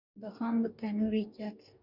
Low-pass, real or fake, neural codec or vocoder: 5.4 kHz; fake; codec, 44.1 kHz, 2.6 kbps, DAC